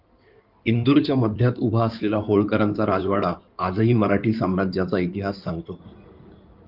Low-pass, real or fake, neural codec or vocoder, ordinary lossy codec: 5.4 kHz; fake; codec, 16 kHz in and 24 kHz out, 2.2 kbps, FireRedTTS-2 codec; Opus, 32 kbps